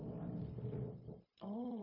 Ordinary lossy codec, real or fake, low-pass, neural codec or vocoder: MP3, 24 kbps; fake; 5.4 kHz; codec, 16 kHz, 0.4 kbps, LongCat-Audio-Codec